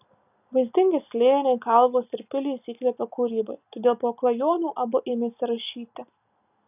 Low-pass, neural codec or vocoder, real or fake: 3.6 kHz; none; real